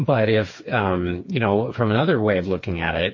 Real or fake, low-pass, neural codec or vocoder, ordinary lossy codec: fake; 7.2 kHz; codec, 16 kHz, 4 kbps, FreqCodec, smaller model; MP3, 32 kbps